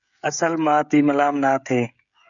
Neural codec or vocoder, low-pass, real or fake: codec, 16 kHz, 16 kbps, FreqCodec, smaller model; 7.2 kHz; fake